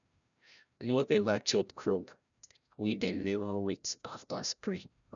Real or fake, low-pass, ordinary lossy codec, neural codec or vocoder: fake; 7.2 kHz; none; codec, 16 kHz, 0.5 kbps, FreqCodec, larger model